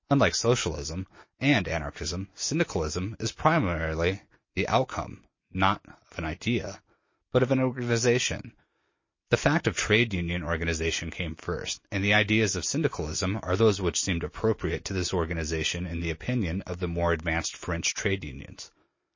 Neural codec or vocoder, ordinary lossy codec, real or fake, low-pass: none; MP3, 32 kbps; real; 7.2 kHz